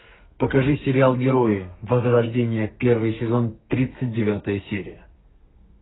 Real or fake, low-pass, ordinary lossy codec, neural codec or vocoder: fake; 7.2 kHz; AAC, 16 kbps; codec, 32 kHz, 1.9 kbps, SNAC